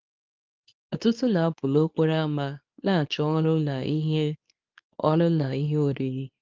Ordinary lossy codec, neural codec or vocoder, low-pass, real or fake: Opus, 24 kbps; codec, 24 kHz, 0.9 kbps, WavTokenizer, medium speech release version 2; 7.2 kHz; fake